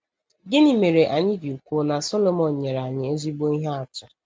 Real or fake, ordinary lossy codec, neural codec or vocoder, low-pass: real; none; none; none